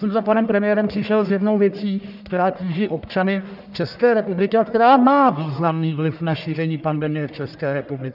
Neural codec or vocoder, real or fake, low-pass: codec, 44.1 kHz, 1.7 kbps, Pupu-Codec; fake; 5.4 kHz